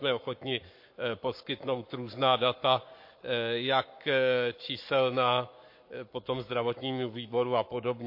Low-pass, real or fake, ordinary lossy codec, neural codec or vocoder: 5.4 kHz; fake; MP3, 32 kbps; codec, 44.1 kHz, 7.8 kbps, Pupu-Codec